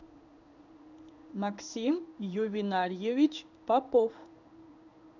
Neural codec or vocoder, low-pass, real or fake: codec, 16 kHz in and 24 kHz out, 1 kbps, XY-Tokenizer; 7.2 kHz; fake